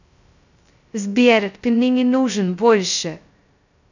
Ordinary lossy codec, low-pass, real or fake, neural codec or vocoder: none; 7.2 kHz; fake; codec, 16 kHz, 0.2 kbps, FocalCodec